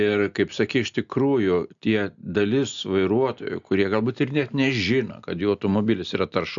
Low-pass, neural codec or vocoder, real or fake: 7.2 kHz; none; real